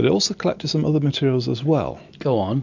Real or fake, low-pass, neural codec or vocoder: real; 7.2 kHz; none